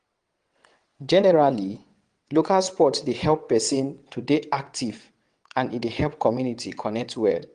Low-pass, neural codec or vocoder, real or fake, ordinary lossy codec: 9.9 kHz; vocoder, 22.05 kHz, 80 mel bands, WaveNeXt; fake; Opus, 24 kbps